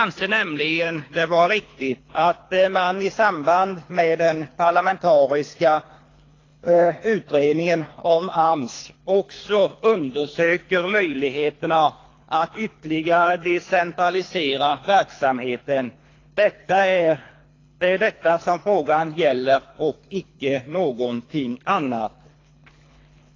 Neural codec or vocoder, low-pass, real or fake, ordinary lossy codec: codec, 24 kHz, 3 kbps, HILCodec; 7.2 kHz; fake; AAC, 32 kbps